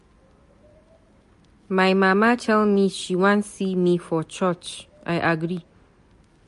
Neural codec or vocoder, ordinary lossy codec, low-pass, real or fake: none; MP3, 48 kbps; 14.4 kHz; real